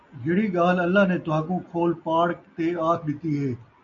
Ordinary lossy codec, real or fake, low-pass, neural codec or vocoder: MP3, 96 kbps; real; 7.2 kHz; none